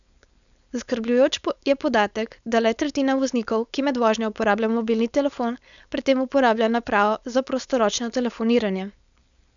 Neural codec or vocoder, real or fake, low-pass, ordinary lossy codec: codec, 16 kHz, 4.8 kbps, FACodec; fake; 7.2 kHz; none